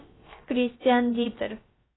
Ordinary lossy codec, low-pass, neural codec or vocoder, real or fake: AAC, 16 kbps; 7.2 kHz; codec, 16 kHz, about 1 kbps, DyCAST, with the encoder's durations; fake